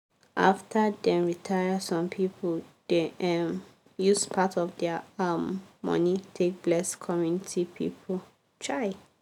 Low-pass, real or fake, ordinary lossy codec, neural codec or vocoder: 19.8 kHz; real; none; none